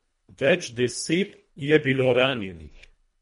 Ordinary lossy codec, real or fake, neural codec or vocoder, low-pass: MP3, 48 kbps; fake; codec, 24 kHz, 1.5 kbps, HILCodec; 10.8 kHz